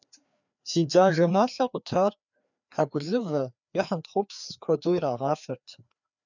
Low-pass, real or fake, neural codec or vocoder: 7.2 kHz; fake; codec, 16 kHz, 2 kbps, FreqCodec, larger model